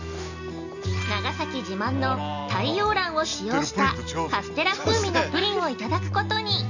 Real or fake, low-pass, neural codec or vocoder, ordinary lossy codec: real; 7.2 kHz; none; none